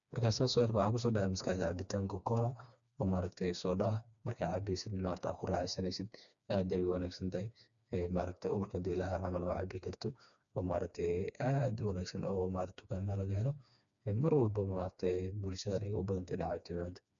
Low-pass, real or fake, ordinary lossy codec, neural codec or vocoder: 7.2 kHz; fake; none; codec, 16 kHz, 2 kbps, FreqCodec, smaller model